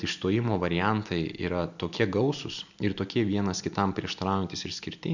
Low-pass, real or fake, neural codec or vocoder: 7.2 kHz; real; none